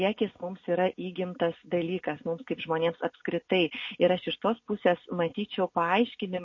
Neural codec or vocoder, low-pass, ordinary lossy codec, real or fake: none; 7.2 kHz; MP3, 32 kbps; real